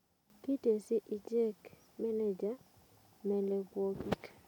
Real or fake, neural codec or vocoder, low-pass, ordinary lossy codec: real; none; 19.8 kHz; none